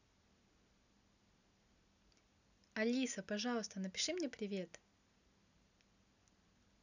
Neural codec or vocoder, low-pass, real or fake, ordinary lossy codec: none; 7.2 kHz; real; none